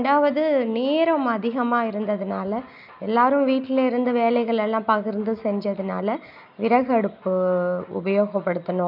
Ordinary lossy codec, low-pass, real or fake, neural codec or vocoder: none; 5.4 kHz; real; none